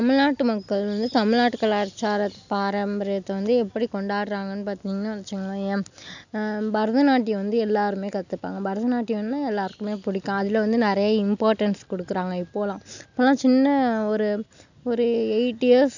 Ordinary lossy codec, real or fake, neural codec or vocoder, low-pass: none; real; none; 7.2 kHz